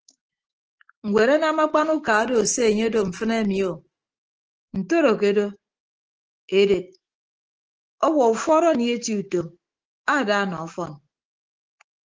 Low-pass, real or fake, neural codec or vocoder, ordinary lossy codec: 7.2 kHz; fake; autoencoder, 48 kHz, 128 numbers a frame, DAC-VAE, trained on Japanese speech; Opus, 16 kbps